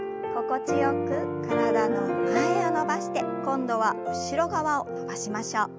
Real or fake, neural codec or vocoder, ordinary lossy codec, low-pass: real; none; none; none